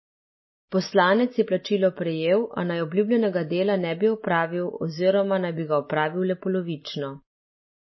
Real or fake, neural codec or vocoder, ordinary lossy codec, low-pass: real; none; MP3, 24 kbps; 7.2 kHz